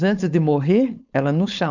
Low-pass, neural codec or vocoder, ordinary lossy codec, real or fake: 7.2 kHz; codec, 16 kHz, 4.8 kbps, FACodec; none; fake